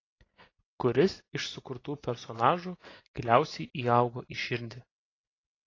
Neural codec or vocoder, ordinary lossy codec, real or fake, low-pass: none; AAC, 32 kbps; real; 7.2 kHz